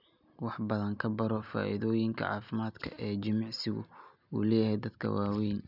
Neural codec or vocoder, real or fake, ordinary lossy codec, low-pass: none; real; none; 5.4 kHz